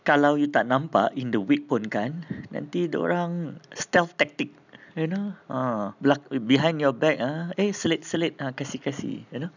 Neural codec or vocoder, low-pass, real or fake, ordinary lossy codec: none; 7.2 kHz; real; none